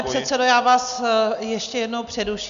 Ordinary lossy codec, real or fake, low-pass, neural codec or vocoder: MP3, 96 kbps; real; 7.2 kHz; none